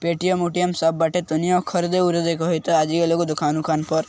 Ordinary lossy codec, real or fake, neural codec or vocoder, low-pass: none; real; none; none